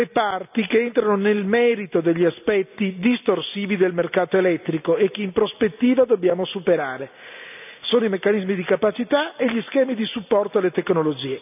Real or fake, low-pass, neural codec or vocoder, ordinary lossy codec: real; 3.6 kHz; none; none